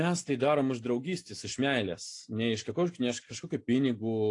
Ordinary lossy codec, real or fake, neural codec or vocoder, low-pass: AAC, 48 kbps; real; none; 10.8 kHz